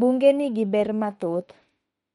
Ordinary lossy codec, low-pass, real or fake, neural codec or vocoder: MP3, 48 kbps; 19.8 kHz; fake; autoencoder, 48 kHz, 32 numbers a frame, DAC-VAE, trained on Japanese speech